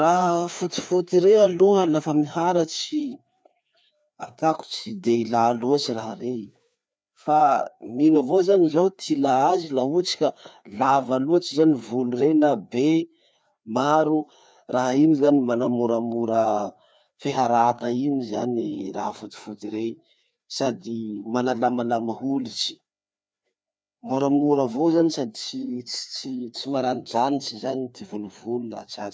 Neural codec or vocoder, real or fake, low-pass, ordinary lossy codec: codec, 16 kHz, 2 kbps, FreqCodec, larger model; fake; none; none